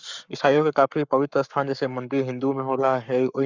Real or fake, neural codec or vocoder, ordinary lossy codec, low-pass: fake; codec, 44.1 kHz, 7.8 kbps, Pupu-Codec; Opus, 64 kbps; 7.2 kHz